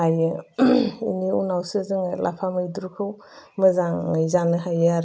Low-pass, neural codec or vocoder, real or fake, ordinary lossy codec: none; none; real; none